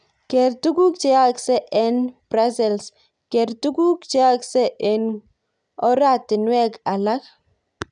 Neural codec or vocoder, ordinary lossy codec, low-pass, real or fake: none; none; 9.9 kHz; real